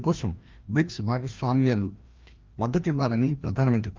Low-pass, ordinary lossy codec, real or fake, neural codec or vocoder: 7.2 kHz; Opus, 32 kbps; fake; codec, 16 kHz, 1 kbps, FreqCodec, larger model